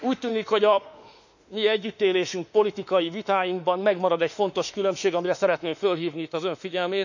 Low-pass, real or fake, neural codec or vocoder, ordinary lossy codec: 7.2 kHz; fake; autoencoder, 48 kHz, 32 numbers a frame, DAC-VAE, trained on Japanese speech; none